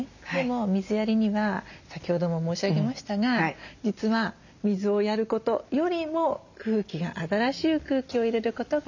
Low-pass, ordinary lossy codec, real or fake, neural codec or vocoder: 7.2 kHz; none; real; none